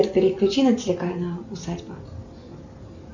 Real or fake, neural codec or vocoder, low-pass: real; none; 7.2 kHz